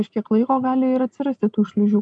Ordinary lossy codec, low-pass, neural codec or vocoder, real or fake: MP3, 64 kbps; 9.9 kHz; none; real